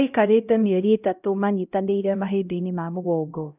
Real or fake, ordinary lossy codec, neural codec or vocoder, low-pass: fake; none; codec, 16 kHz, 0.5 kbps, X-Codec, HuBERT features, trained on LibriSpeech; 3.6 kHz